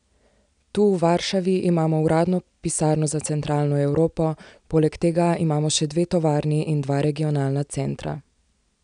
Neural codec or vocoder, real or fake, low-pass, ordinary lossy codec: none; real; 9.9 kHz; none